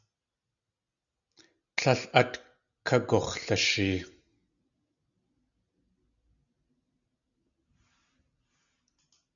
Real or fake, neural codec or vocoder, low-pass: real; none; 7.2 kHz